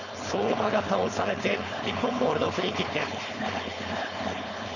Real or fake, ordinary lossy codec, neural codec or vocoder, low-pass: fake; none; codec, 16 kHz, 4.8 kbps, FACodec; 7.2 kHz